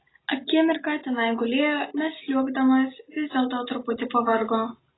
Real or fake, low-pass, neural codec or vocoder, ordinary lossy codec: real; 7.2 kHz; none; AAC, 16 kbps